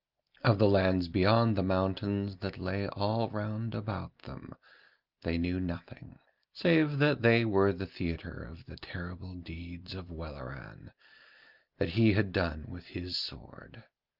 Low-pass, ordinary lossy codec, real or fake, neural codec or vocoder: 5.4 kHz; Opus, 24 kbps; real; none